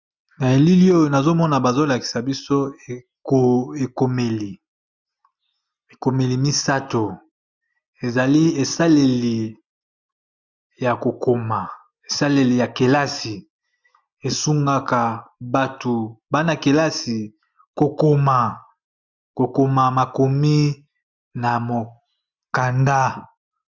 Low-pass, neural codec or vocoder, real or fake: 7.2 kHz; none; real